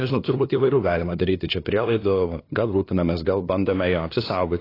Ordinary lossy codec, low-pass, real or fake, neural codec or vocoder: AAC, 24 kbps; 5.4 kHz; fake; codec, 16 kHz, 2 kbps, FunCodec, trained on LibriTTS, 25 frames a second